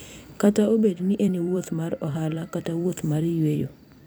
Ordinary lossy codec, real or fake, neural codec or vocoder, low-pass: none; fake; vocoder, 44.1 kHz, 128 mel bands every 256 samples, BigVGAN v2; none